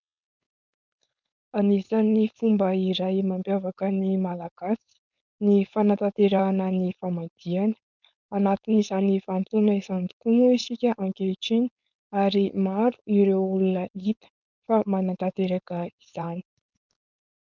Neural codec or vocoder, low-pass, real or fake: codec, 16 kHz, 4.8 kbps, FACodec; 7.2 kHz; fake